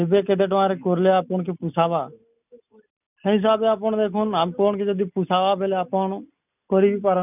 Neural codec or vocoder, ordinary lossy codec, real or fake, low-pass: none; none; real; 3.6 kHz